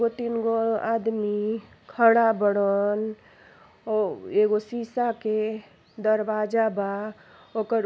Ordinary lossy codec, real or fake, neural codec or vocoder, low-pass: none; real; none; none